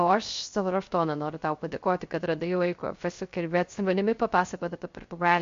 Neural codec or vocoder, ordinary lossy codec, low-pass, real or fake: codec, 16 kHz, 0.3 kbps, FocalCodec; MP3, 48 kbps; 7.2 kHz; fake